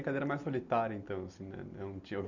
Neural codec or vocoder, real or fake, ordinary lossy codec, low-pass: none; real; none; 7.2 kHz